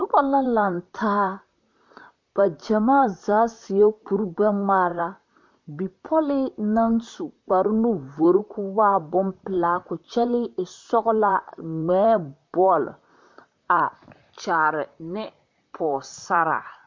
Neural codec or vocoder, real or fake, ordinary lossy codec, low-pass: vocoder, 44.1 kHz, 128 mel bands, Pupu-Vocoder; fake; MP3, 48 kbps; 7.2 kHz